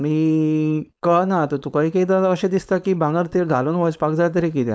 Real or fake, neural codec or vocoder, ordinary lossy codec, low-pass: fake; codec, 16 kHz, 4.8 kbps, FACodec; none; none